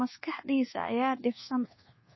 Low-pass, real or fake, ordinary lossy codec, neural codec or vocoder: 7.2 kHz; fake; MP3, 24 kbps; codec, 24 kHz, 1.2 kbps, DualCodec